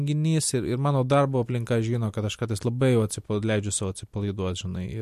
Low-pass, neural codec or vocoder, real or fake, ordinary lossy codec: 14.4 kHz; none; real; MP3, 64 kbps